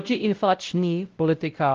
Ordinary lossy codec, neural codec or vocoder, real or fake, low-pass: Opus, 32 kbps; codec, 16 kHz, 0.5 kbps, X-Codec, WavLM features, trained on Multilingual LibriSpeech; fake; 7.2 kHz